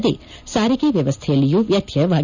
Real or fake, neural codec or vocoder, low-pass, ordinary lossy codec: real; none; 7.2 kHz; none